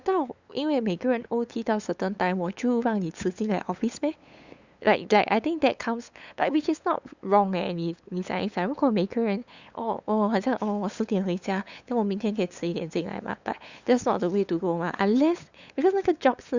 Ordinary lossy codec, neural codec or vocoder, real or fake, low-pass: Opus, 64 kbps; codec, 16 kHz, 8 kbps, FunCodec, trained on LibriTTS, 25 frames a second; fake; 7.2 kHz